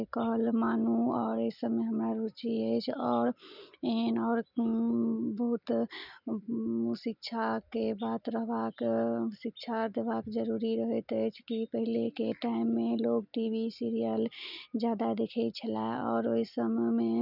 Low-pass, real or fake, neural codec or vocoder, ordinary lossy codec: 5.4 kHz; real; none; none